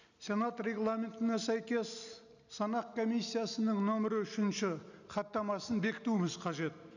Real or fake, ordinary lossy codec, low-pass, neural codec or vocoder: real; none; 7.2 kHz; none